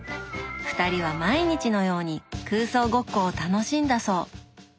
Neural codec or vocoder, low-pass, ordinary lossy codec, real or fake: none; none; none; real